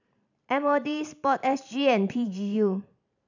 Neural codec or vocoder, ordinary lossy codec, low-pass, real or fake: vocoder, 44.1 kHz, 128 mel bands every 512 samples, BigVGAN v2; none; 7.2 kHz; fake